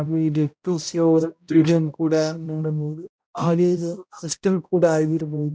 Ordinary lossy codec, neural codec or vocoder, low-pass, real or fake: none; codec, 16 kHz, 0.5 kbps, X-Codec, HuBERT features, trained on balanced general audio; none; fake